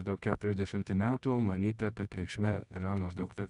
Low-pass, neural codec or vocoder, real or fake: 10.8 kHz; codec, 24 kHz, 0.9 kbps, WavTokenizer, medium music audio release; fake